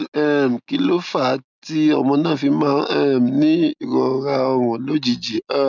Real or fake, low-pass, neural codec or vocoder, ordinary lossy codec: real; 7.2 kHz; none; none